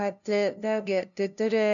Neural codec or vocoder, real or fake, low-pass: codec, 16 kHz, 0.5 kbps, FunCodec, trained on LibriTTS, 25 frames a second; fake; 7.2 kHz